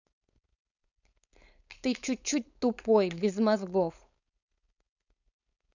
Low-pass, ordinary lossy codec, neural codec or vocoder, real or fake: 7.2 kHz; none; codec, 16 kHz, 4.8 kbps, FACodec; fake